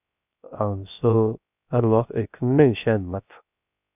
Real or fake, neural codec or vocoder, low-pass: fake; codec, 16 kHz, 0.3 kbps, FocalCodec; 3.6 kHz